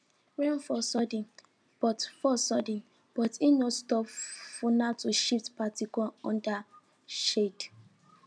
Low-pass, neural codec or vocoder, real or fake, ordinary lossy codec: 9.9 kHz; none; real; none